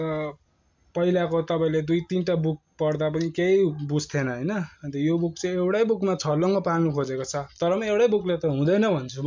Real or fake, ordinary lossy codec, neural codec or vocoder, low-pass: real; MP3, 64 kbps; none; 7.2 kHz